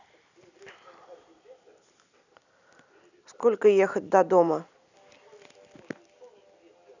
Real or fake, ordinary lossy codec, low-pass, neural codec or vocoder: real; none; 7.2 kHz; none